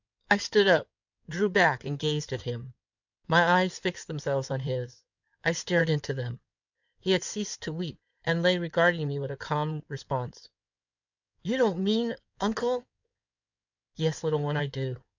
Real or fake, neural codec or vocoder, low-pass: fake; codec, 16 kHz in and 24 kHz out, 2.2 kbps, FireRedTTS-2 codec; 7.2 kHz